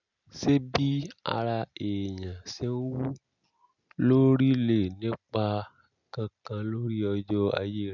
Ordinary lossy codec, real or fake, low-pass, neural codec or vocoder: none; real; 7.2 kHz; none